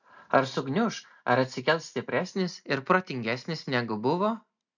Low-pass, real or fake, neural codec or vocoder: 7.2 kHz; real; none